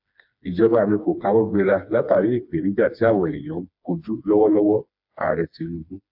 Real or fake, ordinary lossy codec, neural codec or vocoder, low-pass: fake; AAC, 48 kbps; codec, 16 kHz, 2 kbps, FreqCodec, smaller model; 5.4 kHz